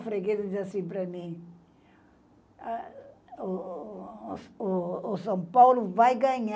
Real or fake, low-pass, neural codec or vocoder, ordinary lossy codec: real; none; none; none